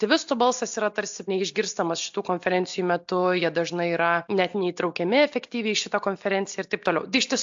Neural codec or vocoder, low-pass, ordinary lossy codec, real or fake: none; 7.2 kHz; AAC, 64 kbps; real